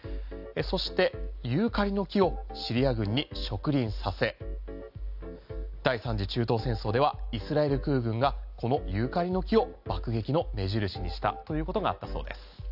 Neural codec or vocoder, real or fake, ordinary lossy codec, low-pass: none; real; AAC, 48 kbps; 5.4 kHz